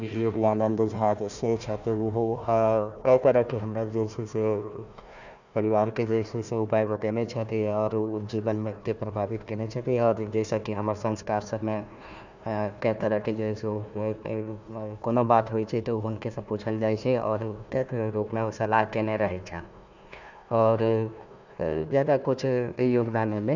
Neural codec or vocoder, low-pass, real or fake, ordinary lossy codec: codec, 16 kHz, 1 kbps, FunCodec, trained on Chinese and English, 50 frames a second; 7.2 kHz; fake; none